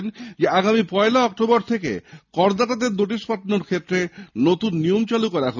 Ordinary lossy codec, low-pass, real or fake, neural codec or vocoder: none; 7.2 kHz; real; none